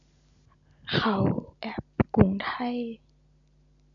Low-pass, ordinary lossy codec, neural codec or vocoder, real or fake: 7.2 kHz; none; none; real